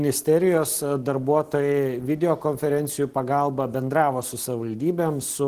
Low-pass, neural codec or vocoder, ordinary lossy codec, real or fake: 14.4 kHz; none; Opus, 16 kbps; real